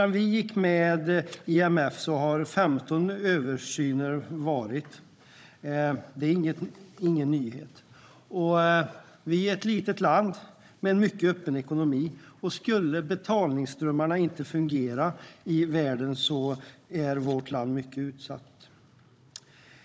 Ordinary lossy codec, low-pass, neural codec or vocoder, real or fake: none; none; codec, 16 kHz, 16 kbps, FunCodec, trained on Chinese and English, 50 frames a second; fake